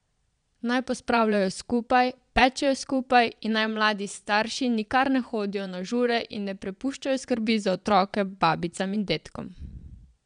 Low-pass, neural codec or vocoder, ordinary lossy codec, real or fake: 9.9 kHz; vocoder, 22.05 kHz, 80 mel bands, Vocos; none; fake